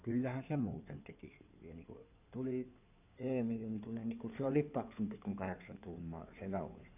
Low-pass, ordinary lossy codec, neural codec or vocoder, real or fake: 3.6 kHz; none; codec, 16 kHz in and 24 kHz out, 2.2 kbps, FireRedTTS-2 codec; fake